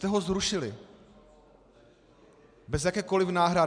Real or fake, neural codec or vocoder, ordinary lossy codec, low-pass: real; none; MP3, 96 kbps; 9.9 kHz